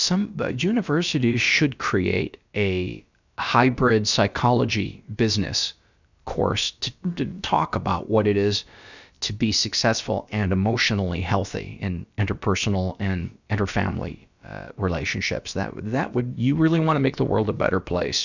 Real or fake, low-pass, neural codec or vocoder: fake; 7.2 kHz; codec, 16 kHz, about 1 kbps, DyCAST, with the encoder's durations